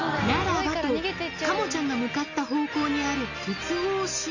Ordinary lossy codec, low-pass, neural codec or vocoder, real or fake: none; 7.2 kHz; none; real